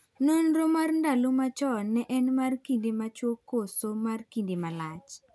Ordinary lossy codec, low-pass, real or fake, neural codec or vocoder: none; none; real; none